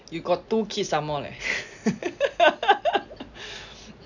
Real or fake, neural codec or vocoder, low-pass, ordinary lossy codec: real; none; 7.2 kHz; none